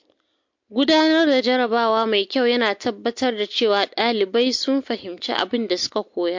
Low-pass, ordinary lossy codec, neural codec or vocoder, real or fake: 7.2 kHz; MP3, 48 kbps; none; real